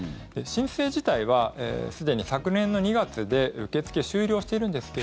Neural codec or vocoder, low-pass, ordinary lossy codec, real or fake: none; none; none; real